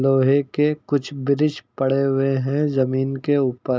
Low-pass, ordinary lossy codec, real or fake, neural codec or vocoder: none; none; real; none